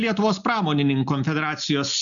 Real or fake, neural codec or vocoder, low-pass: real; none; 7.2 kHz